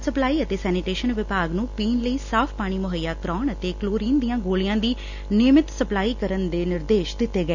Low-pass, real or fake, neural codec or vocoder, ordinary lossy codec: 7.2 kHz; real; none; none